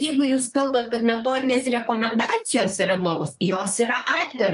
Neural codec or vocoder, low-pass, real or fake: codec, 24 kHz, 1 kbps, SNAC; 10.8 kHz; fake